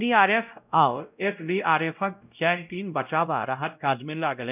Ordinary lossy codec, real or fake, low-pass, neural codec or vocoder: none; fake; 3.6 kHz; codec, 16 kHz, 0.5 kbps, X-Codec, WavLM features, trained on Multilingual LibriSpeech